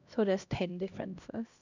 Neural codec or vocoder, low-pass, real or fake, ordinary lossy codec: codec, 16 kHz, 1 kbps, X-Codec, HuBERT features, trained on LibriSpeech; 7.2 kHz; fake; none